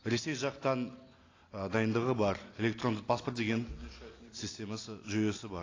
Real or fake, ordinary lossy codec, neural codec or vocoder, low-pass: real; AAC, 32 kbps; none; 7.2 kHz